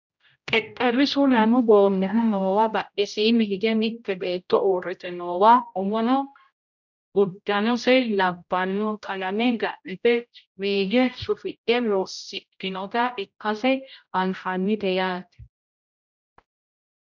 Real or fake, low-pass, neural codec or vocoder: fake; 7.2 kHz; codec, 16 kHz, 0.5 kbps, X-Codec, HuBERT features, trained on general audio